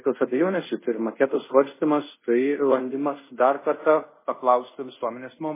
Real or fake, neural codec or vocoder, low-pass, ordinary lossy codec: fake; codec, 24 kHz, 0.5 kbps, DualCodec; 3.6 kHz; MP3, 16 kbps